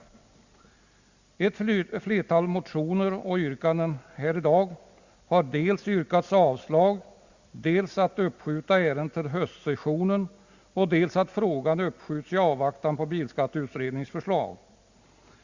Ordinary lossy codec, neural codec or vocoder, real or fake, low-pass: Opus, 64 kbps; none; real; 7.2 kHz